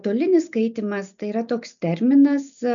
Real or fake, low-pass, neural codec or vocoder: real; 7.2 kHz; none